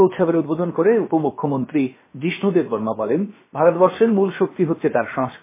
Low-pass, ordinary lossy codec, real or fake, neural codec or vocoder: 3.6 kHz; MP3, 16 kbps; fake; codec, 16 kHz, about 1 kbps, DyCAST, with the encoder's durations